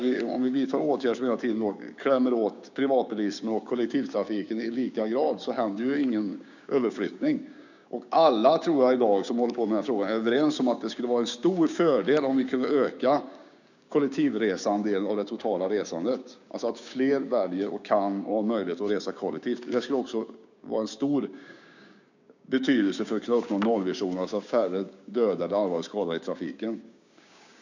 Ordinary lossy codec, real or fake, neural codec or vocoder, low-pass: none; fake; codec, 16 kHz, 6 kbps, DAC; 7.2 kHz